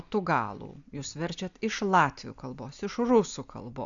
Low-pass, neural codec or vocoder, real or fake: 7.2 kHz; none; real